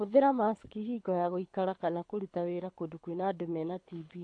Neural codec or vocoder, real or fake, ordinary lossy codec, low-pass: codec, 24 kHz, 6 kbps, HILCodec; fake; AAC, 64 kbps; 9.9 kHz